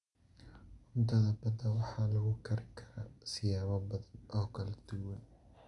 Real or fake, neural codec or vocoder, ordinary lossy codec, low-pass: real; none; MP3, 96 kbps; 10.8 kHz